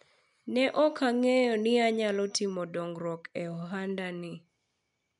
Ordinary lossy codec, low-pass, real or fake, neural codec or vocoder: none; 10.8 kHz; real; none